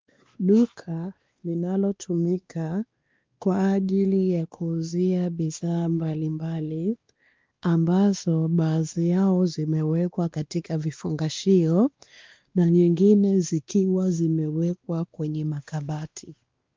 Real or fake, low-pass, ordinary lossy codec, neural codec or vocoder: fake; 7.2 kHz; Opus, 32 kbps; codec, 16 kHz, 2 kbps, X-Codec, WavLM features, trained on Multilingual LibriSpeech